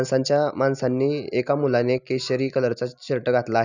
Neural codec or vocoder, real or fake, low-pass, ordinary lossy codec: none; real; 7.2 kHz; none